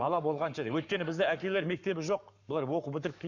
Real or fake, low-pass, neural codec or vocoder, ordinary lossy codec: fake; 7.2 kHz; codec, 44.1 kHz, 7.8 kbps, Pupu-Codec; none